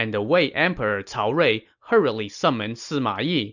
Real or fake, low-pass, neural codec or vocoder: real; 7.2 kHz; none